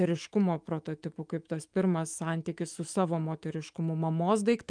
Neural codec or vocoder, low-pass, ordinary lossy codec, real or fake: autoencoder, 48 kHz, 128 numbers a frame, DAC-VAE, trained on Japanese speech; 9.9 kHz; Opus, 32 kbps; fake